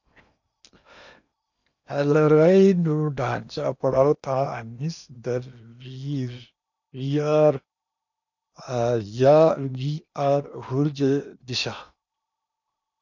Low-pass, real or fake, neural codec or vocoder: 7.2 kHz; fake; codec, 16 kHz in and 24 kHz out, 0.8 kbps, FocalCodec, streaming, 65536 codes